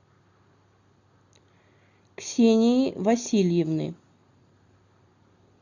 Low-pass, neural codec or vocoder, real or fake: 7.2 kHz; none; real